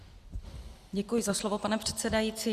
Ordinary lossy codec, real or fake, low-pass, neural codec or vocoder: Opus, 64 kbps; real; 14.4 kHz; none